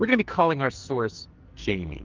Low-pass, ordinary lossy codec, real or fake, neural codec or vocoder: 7.2 kHz; Opus, 32 kbps; fake; codec, 44.1 kHz, 2.6 kbps, SNAC